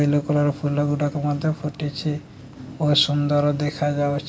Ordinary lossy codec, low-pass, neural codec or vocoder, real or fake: none; none; none; real